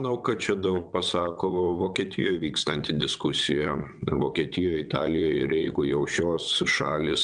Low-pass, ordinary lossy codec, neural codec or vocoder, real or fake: 9.9 kHz; MP3, 96 kbps; vocoder, 22.05 kHz, 80 mel bands, WaveNeXt; fake